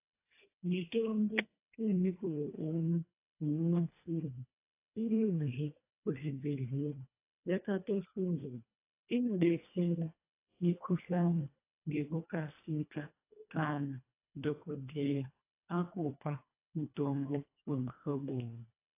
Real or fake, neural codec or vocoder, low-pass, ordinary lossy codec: fake; codec, 24 kHz, 1.5 kbps, HILCodec; 3.6 kHz; AAC, 24 kbps